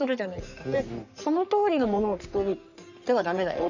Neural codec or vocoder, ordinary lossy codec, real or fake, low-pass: codec, 44.1 kHz, 3.4 kbps, Pupu-Codec; none; fake; 7.2 kHz